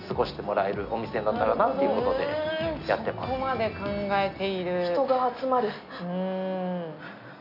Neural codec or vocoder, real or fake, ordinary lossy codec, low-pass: none; real; none; 5.4 kHz